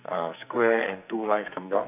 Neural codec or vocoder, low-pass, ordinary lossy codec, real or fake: codec, 44.1 kHz, 2.6 kbps, SNAC; 3.6 kHz; none; fake